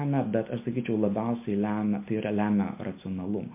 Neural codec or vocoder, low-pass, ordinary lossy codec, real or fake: none; 3.6 kHz; MP3, 24 kbps; real